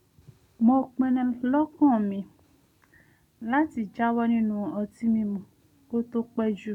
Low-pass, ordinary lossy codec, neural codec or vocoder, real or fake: 19.8 kHz; none; none; real